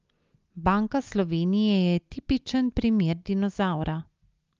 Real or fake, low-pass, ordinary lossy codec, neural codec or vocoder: real; 7.2 kHz; Opus, 32 kbps; none